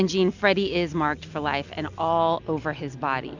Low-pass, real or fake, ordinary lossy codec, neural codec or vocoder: 7.2 kHz; real; Opus, 64 kbps; none